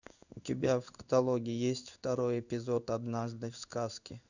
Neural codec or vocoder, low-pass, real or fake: codec, 16 kHz in and 24 kHz out, 1 kbps, XY-Tokenizer; 7.2 kHz; fake